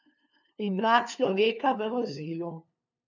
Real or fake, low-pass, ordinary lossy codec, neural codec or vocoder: fake; 7.2 kHz; none; codec, 16 kHz, 2 kbps, FunCodec, trained on LibriTTS, 25 frames a second